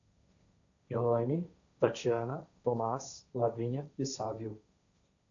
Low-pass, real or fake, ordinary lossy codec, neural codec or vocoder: 7.2 kHz; fake; AAC, 64 kbps; codec, 16 kHz, 1.1 kbps, Voila-Tokenizer